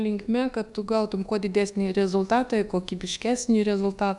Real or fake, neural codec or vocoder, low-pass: fake; codec, 24 kHz, 1.2 kbps, DualCodec; 10.8 kHz